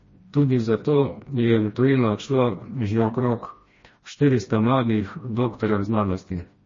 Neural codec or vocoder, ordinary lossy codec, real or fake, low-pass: codec, 16 kHz, 1 kbps, FreqCodec, smaller model; MP3, 32 kbps; fake; 7.2 kHz